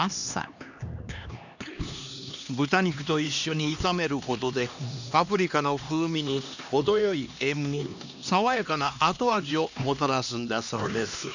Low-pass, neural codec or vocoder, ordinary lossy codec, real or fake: 7.2 kHz; codec, 16 kHz, 2 kbps, X-Codec, HuBERT features, trained on LibriSpeech; none; fake